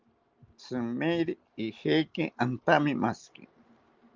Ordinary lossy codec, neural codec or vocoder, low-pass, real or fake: Opus, 24 kbps; none; 7.2 kHz; real